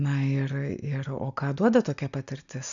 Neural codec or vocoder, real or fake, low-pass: none; real; 7.2 kHz